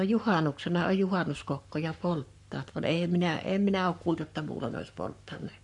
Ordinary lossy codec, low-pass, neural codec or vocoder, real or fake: none; 10.8 kHz; codec, 44.1 kHz, 7.8 kbps, Pupu-Codec; fake